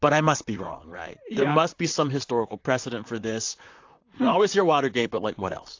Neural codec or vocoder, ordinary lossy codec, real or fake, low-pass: vocoder, 44.1 kHz, 128 mel bands, Pupu-Vocoder; AAC, 48 kbps; fake; 7.2 kHz